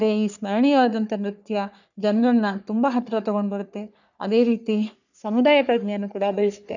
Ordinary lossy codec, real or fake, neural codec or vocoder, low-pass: none; fake; codec, 44.1 kHz, 3.4 kbps, Pupu-Codec; 7.2 kHz